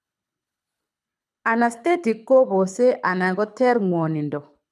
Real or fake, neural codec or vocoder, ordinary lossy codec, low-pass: fake; codec, 24 kHz, 6 kbps, HILCodec; none; none